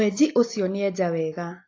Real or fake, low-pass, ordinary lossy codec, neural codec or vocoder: real; 7.2 kHz; AAC, 32 kbps; none